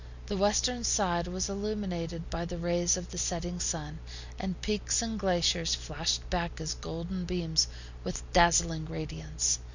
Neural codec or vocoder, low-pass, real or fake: none; 7.2 kHz; real